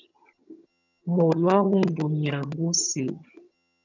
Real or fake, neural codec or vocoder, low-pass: fake; vocoder, 22.05 kHz, 80 mel bands, HiFi-GAN; 7.2 kHz